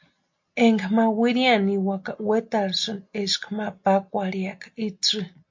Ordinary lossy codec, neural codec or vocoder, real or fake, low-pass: MP3, 48 kbps; none; real; 7.2 kHz